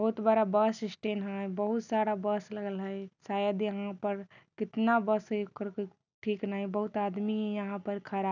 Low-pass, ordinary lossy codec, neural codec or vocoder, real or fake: 7.2 kHz; none; none; real